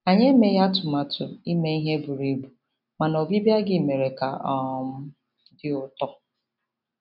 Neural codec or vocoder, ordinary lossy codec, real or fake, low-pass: none; none; real; 5.4 kHz